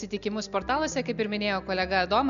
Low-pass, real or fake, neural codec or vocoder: 7.2 kHz; real; none